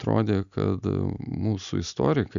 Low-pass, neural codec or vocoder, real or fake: 7.2 kHz; none; real